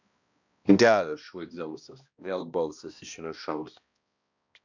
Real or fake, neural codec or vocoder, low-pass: fake; codec, 16 kHz, 1 kbps, X-Codec, HuBERT features, trained on balanced general audio; 7.2 kHz